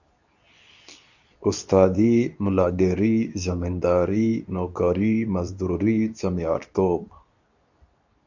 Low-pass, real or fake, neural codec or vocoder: 7.2 kHz; fake; codec, 24 kHz, 0.9 kbps, WavTokenizer, medium speech release version 2